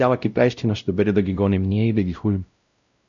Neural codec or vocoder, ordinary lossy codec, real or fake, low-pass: codec, 16 kHz, 0.5 kbps, X-Codec, WavLM features, trained on Multilingual LibriSpeech; MP3, 96 kbps; fake; 7.2 kHz